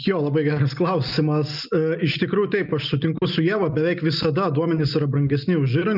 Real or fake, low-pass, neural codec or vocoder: real; 5.4 kHz; none